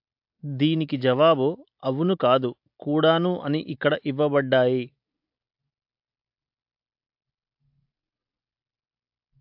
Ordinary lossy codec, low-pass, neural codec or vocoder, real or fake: AAC, 48 kbps; 5.4 kHz; none; real